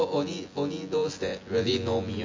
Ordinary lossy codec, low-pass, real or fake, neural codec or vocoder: MP3, 64 kbps; 7.2 kHz; fake; vocoder, 24 kHz, 100 mel bands, Vocos